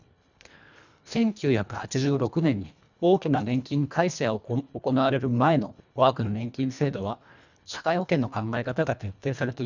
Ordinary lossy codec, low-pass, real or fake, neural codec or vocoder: none; 7.2 kHz; fake; codec, 24 kHz, 1.5 kbps, HILCodec